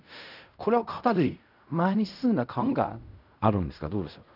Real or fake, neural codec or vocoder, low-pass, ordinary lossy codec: fake; codec, 16 kHz in and 24 kHz out, 0.4 kbps, LongCat-Audio-Codec, fine tuned four codebook decoder; 5.4 kHz; none